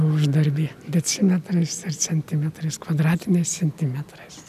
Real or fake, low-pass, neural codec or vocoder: real; 14.4 kHz; none